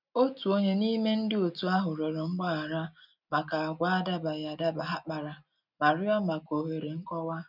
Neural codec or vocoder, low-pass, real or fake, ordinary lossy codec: none; 5.4 kHz; real; none